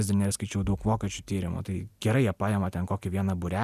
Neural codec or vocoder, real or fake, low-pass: none; real; 14.4 kHz